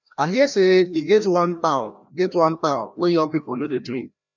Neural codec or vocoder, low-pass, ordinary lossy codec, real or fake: codec, 16 kHz, 1 kbps, FreqCodec, larger model; 7.2 kHz; none; fake